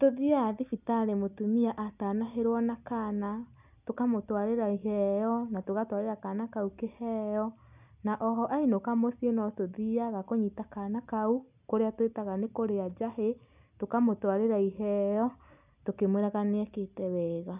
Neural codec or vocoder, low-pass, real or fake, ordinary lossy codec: none; 3.6 kHz; real; none